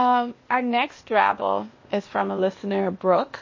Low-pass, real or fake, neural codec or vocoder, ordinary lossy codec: 7.2 kHz; fake; codec, 16 kHz, 0.8 kbps, ZipCodec; MP3, 32 kbps